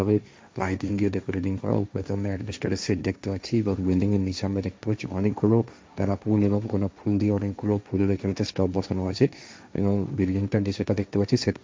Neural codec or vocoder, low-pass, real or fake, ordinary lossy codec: codec, 16 kHz, 1.1 kbps, Voila-Tokenizer; none; fake; none